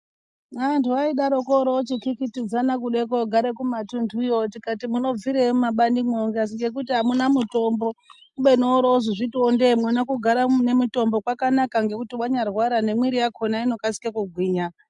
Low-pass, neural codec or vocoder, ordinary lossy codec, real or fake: 10.8 kHz; none; MP3, 64 kbps; real